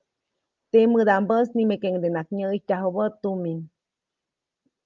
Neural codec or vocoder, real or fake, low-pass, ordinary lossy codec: none; real; 7.2 kHz; Opus, 32 kbps